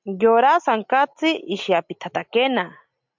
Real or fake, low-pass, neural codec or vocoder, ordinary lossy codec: real; 7.2 kHz; none; MP3, 64 kbps